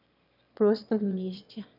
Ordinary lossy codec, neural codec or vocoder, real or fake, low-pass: none; autoencoder, 22.05 kHz, a latent of 192 numbers a frame, VITS, trained on one speaker; fake; 5.4 kHz